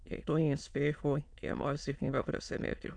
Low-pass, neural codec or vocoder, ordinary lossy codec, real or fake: 9.9 kHz; autoencoder, 22.05 kHz, a latent of 192 numbers a frame, VITS, trained on many speakers; none; fake